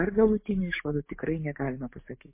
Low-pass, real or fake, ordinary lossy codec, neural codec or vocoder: 3.6 kHz; fake; MP3, 24 kbps; codec, 44.1 kHz, 7.8 kbps, DAC